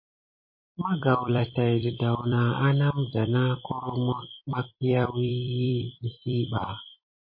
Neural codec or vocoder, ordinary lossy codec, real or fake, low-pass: vocoder, 44.1 kHz, 128 mel bands every 256 samples, BigVGAN v2; MP3, 24 kbps; fake; 5.4 kHz